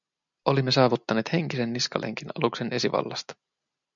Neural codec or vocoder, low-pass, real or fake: none; 7.2 kHz; real